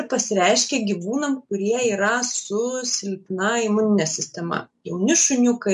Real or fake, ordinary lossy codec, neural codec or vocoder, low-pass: real; MP3, 64 kbps; none; 10.8 kHz